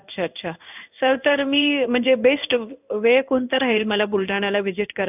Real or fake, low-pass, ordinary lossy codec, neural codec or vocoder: fake; 3.6 kHz; none; codec, 16 kHz in and 24 kHz out, 1 kbps, XY-Tokenizer